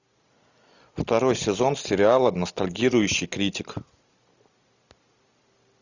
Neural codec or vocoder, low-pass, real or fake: none; 7.2 kHz; real